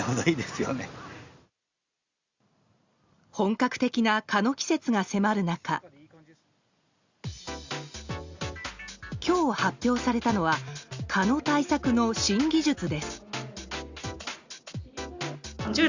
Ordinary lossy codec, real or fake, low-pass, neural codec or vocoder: Opus, 64 kbps; real; 7.2 kHz; none